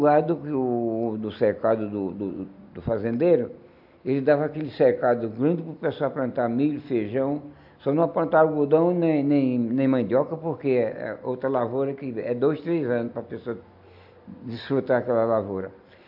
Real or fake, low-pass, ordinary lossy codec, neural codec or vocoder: real; 5.4 kHz; AAC, 48 kbps; none